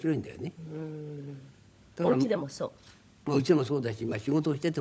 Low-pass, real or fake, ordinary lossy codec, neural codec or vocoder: none; fake; none; codec, 16 kHz, 16 kbps, FunCodec, trained on LibriTTS, 50 frames a second